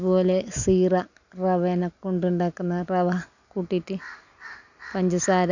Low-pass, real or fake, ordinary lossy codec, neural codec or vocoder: 7.2 kHz; real; none; none